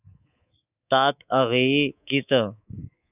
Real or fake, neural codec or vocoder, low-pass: fake; codec, 24 kHz, 3.1 kbps, DualCodec; 3.6 kHz